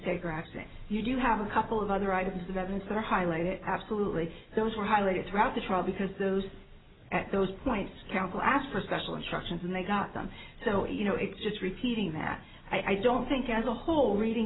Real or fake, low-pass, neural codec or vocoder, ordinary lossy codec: real; 7.2 kHz; none; AAC, 16 kbps